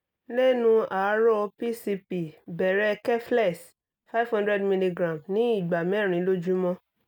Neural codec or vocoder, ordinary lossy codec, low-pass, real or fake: none; none; 19.8 kHz; real